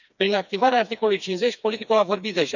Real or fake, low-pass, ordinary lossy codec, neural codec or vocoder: fake; 7.2 kHz; none; codec, 16 kHz, 2 kbps, FreqCodec, smaller model